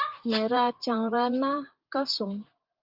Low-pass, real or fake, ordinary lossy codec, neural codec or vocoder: 5.4 kHz; fake; Opus, 24 kbps; vocoder, 44.1 kHz, 128 mel bands, Pupu-Vocoder